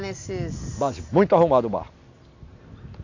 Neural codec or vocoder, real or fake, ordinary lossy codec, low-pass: none; real; AAC, 48 kbps; 7.2 kHz